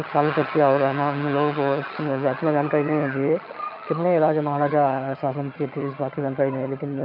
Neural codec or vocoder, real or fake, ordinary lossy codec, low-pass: vocoder, 22.05 kHz, 80 mel bands, HiFi-GAN; fake; MP3, 48 kbps; 5.4 kHz